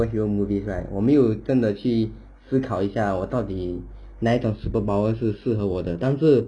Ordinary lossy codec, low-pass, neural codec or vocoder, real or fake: none; 9.9 kHz; none; real